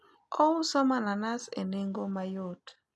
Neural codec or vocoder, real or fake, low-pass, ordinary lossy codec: none; real; none; none